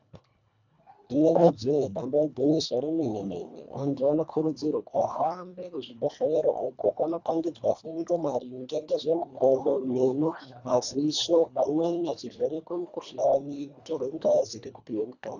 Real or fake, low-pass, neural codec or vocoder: fake; 7.2 kHz; codec, 24 kHz, 1.5 kbps, HILCodec